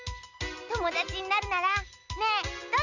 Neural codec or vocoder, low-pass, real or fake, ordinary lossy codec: none; 7.2 kHz; real; none